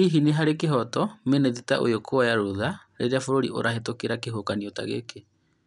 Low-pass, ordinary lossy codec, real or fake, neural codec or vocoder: 10.8 kHz; none; real; none